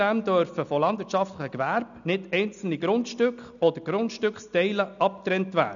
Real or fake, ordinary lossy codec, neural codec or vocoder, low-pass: real; none; none; 7.2 kHz